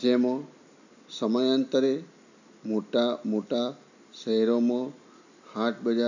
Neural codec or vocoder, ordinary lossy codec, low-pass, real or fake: none; none; 7.2 kHz; real